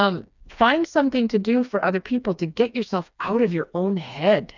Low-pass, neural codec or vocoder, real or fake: 7.2 kHz; codec, 16 kHz, 2 kbps, FreqCodec, smaller model; fake